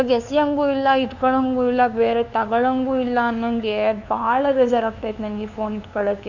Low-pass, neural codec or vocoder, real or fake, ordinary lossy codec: 7.2 kHz; codec, 16 kHz, 2 kbps, FunCodec, trained on LibriTTS, 25 frames a second; fake; none